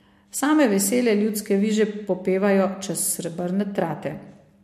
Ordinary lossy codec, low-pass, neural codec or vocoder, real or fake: MP3, 64 kbps; 14.4 kHz; none; real